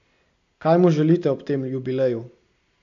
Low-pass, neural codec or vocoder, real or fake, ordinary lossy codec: 7.2 kHz; none; real; AAC, 64 kbps